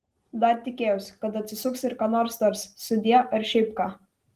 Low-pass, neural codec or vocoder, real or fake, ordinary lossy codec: 14.4 kHz; none; real; Opus, 24 kbps